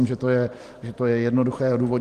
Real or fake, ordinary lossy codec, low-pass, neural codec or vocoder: fake; Opus, 24 kbps; 14.4 kHz; vocoder, 44.1 kHz, 128 mel bands every 512 samples, BigVGAN v2